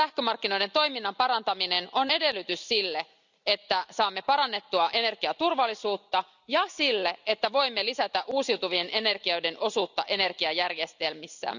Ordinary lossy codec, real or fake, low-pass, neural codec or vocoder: none; real; 7.2 kHz; none